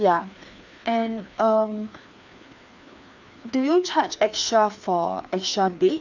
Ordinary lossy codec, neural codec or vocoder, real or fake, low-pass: none; codec, 16 kHz, 2 kbps, FreqCodec, larger model; fake; 7.2 kHz